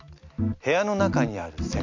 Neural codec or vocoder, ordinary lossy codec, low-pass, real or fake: none; MP3, 48 kbps; 7.2 kHz; real